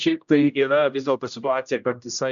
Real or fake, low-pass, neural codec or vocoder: fake; 7.2 kHz; codec, 16 kHz, 0.5 kbps, X-Codec, HuBERT features, trained on general audio